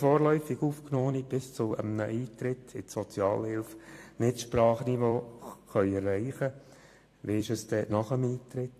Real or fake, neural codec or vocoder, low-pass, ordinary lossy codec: fake; vocoder, 44.1 kHz, 128 mel bands every 256 samples, BigVGAN v2; 14.4 kHz; AAC, 64 kbps